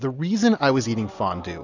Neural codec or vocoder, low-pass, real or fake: none; 7.2 kHz; real